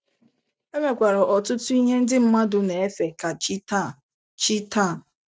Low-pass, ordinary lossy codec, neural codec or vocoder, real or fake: none; none; none; real